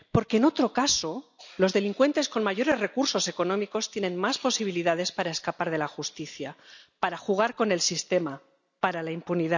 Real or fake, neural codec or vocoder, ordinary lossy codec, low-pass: real; none; none; 7.2 kHz